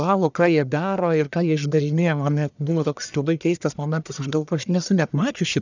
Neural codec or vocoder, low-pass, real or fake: codec, 44.1 kHz, 1.7 kbps, Pupu-Codec; 7.2 kHz; fake